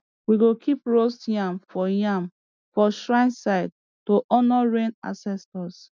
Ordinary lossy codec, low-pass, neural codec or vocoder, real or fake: none; none; none; real